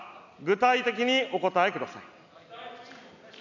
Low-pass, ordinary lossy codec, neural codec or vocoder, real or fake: 7.2 kHz; none; none; real